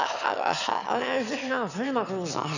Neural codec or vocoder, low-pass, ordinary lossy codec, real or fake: autoencoder, 22.05 kHz, a latent of 192 numbers a frame, VITS, trained on one speaker; 7.2 kHz; none; fake